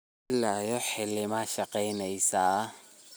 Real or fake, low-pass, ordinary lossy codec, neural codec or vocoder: real; none; none; none